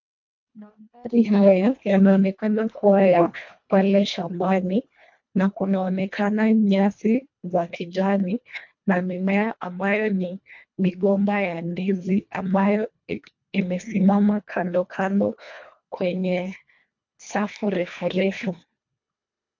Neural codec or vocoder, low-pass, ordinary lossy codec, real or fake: codec, 24 kHz, 1.5 kbps, HILCodec; 7.2 kHz; MP3, 48 kbps; fake